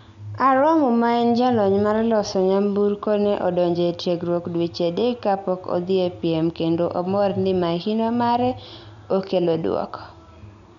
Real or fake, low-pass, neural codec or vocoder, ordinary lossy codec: real; 7.2 kHz; none; none